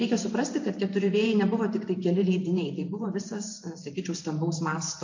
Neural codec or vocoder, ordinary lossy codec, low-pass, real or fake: vocoder, 44.1 kHz, 128 mel bands every 512 samples, BigVGAN v2; AAC, 48 kbps; 7.2 kHz; fake